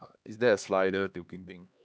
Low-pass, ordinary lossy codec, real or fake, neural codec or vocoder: none; none; fake; codec, 16 kHz, 2 kbps, X-Codec, HuBERT features, trained on LibriSpeech